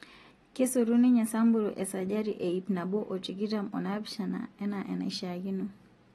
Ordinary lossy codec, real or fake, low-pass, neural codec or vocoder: AAC, 32 kbps; real; 19.8 kHz; none